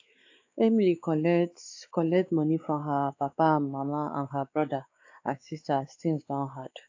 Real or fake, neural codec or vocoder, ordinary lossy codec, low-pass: fake; codec, 16 kHz, 4 kbps, X-Codec, WavLM features, trained on Multilingual LibriSpeech; AAC, 48 kbps; 7.2 kHz